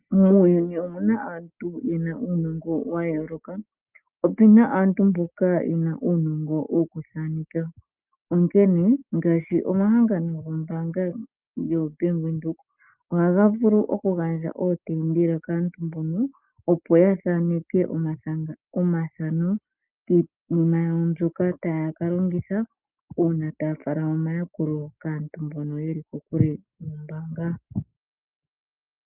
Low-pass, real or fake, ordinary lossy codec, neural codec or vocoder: 3.6 kHz; real; Opus, 24 kbps; none